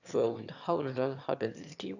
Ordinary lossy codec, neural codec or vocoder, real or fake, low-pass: none; autoencoder, 22.05 kHz, a latent of 192 numbers a frame, VITS, trained on one speaker; fake; 7.2 kHz